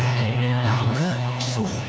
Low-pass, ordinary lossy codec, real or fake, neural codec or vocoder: none; none; fake; codec, 16 kHz, 1 kbps, FunCodec, trained on LibriTTS, 50 frames a second